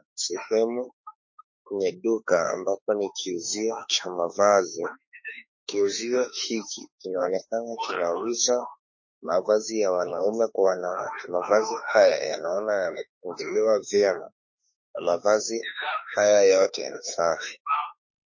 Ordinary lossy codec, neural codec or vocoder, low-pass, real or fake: MP3, 32 kbps; autoencoder, 48 kHz, 32 numbers a frame, DAC-VAE, trained on Japanese speech; 7.2 kHz; fake